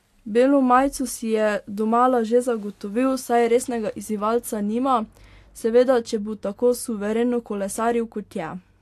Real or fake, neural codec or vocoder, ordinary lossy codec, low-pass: real; none; AAC, 64 kbps; 14.4 kHz